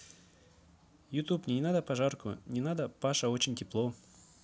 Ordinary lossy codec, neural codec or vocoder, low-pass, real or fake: none; none; none; real